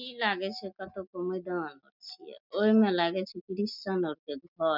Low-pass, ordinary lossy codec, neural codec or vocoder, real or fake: 5.4 kHz; none; none; real